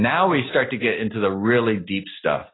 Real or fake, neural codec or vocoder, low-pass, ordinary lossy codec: real; none; 7.2 kHz; AAC, 16 kbps